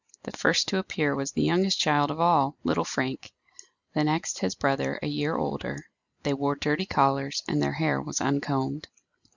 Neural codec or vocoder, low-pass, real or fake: none; 7.2 kHz; real